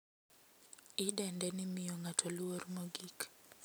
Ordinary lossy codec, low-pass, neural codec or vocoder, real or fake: none; none; none; real